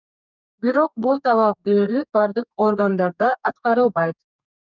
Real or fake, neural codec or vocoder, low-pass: fake; codec, 32 kHz, 1.9 kbps, SNAC; 7.2 kHz